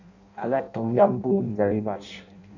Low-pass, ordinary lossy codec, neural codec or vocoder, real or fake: 7.2 kHz; none; codec, 16 kHz in and 24 kHz out, 0.6 kbps, FireRedTTS-2 codec; fake